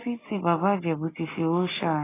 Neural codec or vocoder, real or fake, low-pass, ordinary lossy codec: none; real; 3.6 kHz; AAC, 16 kbps